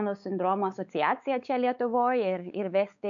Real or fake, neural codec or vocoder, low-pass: fake; codec, 16 kHz, 4 kbps, X-Codec, WavLM features, trained on Multilingual LibriSpeech; 7.2 kHz